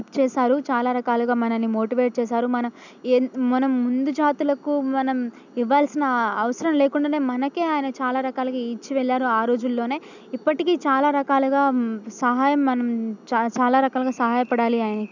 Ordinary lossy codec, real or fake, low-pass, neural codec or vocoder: none; real; 7.2 kHz; none